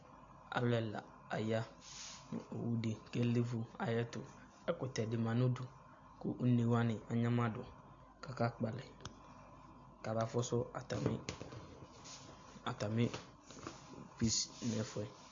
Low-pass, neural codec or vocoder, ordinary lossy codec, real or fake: 7.2 kHz; none; AAC, 48 kbps; real